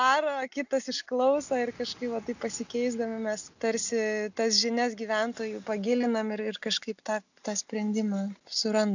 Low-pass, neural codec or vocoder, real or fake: 7.2 kHz; none; real